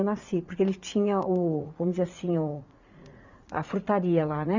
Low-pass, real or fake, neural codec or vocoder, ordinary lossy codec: 7.2 kHz; fake; vocoder, 44.1 kHz, 80 mel bands, Vocos; Opus, 64 kbps